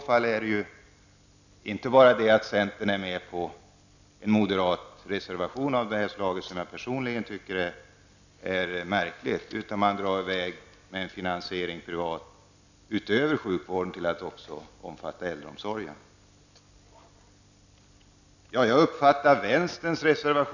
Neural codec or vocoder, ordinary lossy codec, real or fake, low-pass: none; none; real; 7.2 kHz